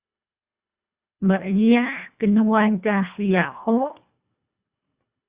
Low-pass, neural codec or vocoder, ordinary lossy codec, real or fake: 3.6 kHz; codec, 24 kHz, 1.5 kbps, HILCodec; Opus, 64 kbps; fake